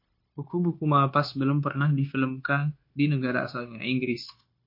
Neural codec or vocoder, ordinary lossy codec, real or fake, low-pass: codec, 16 kHz, 0.9 kbps, LongCat-Audio-Codec; MP3, 32 kbps; fake; 5.4 kHz